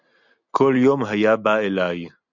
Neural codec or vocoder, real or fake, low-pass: none; real; 7.2 kHz